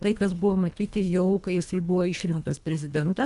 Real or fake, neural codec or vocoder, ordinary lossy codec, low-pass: fake; codec, 24 kHz, 1.5 kbps, HILCodec; AAC, 96 kbps; 10.8 kHz